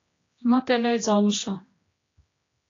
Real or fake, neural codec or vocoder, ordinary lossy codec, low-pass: fake; codec, 16 kHz, 1 kbps, X-Codec, HuBERT features, trained on general audio; AAC, 32 kbps; 7.2 kHz